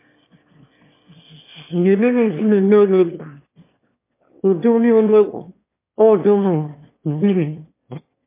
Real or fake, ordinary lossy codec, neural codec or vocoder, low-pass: fake; MP3, 24 kbps; autoencoder, 22.05 kHz, a latent of 192 numbers a frame, VITS, trained on one speaker; 3.6 kHz